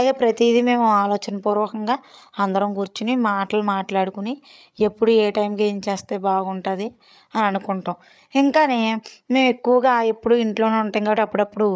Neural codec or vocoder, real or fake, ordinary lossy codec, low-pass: codec, 16 kHz, 8 kbps, FreqCodec, larger model; fake; none; none